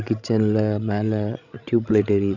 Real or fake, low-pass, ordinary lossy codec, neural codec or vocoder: fake; 7.2 kHz; none; codec, 16 kHz, 16 kbps, FreqCodec, larger model